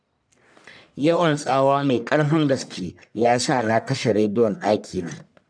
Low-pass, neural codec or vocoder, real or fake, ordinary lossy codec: 9.9 kHz; codec, 44.1 kHz, 1.7 kbps, Pupu-Codec; fake; none